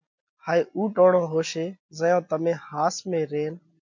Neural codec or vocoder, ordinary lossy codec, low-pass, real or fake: none; MP3, 48 kbps; 7.2 kHz; real